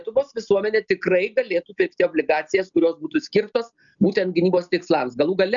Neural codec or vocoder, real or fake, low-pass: none; real; 7.2 kHz